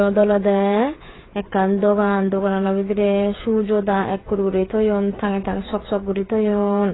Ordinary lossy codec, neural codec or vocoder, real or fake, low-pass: AAC, 16 kbps; codec, 16 kHz, 8 kbps, FreqCodec, smaller model; fake; 7.2 kHz